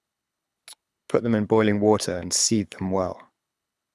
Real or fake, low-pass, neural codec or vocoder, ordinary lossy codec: fake; none; codec, 24 kHz, 6 kbps, HILCodec; none